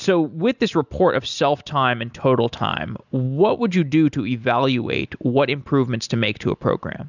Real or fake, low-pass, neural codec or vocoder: real; 7.2 kHz; none